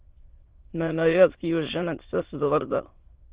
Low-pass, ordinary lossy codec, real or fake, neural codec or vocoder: 3.6 kHz; Opus, 16 kbps; fake; autoencoder, 22.05 kHz, a latent of 192 numbers a frame, VITS, trained on many speakers